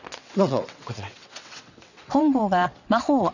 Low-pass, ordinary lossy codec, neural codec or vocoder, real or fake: 7.2 kHz; none; vocoder, 22.05 kHz, 80 mel bands, WaveNeXt; fake